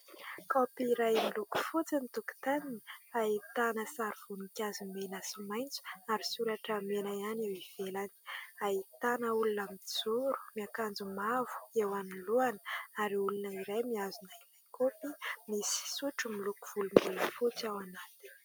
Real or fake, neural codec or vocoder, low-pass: real; none; 19.8 kHz